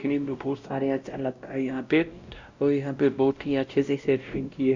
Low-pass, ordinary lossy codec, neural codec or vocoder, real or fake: 7.2 kHz; Opus, 64 kbps; codec, 16 kHz, 0.5 kbps, X-Codec, WavLM features, trained on Multilingual LibriSpeech; fake